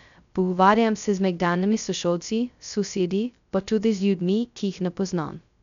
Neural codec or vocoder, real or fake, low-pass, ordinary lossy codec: codec, 16 kHz, 0.2 kbps, FocalCodec; fake; 7.2 kHz; none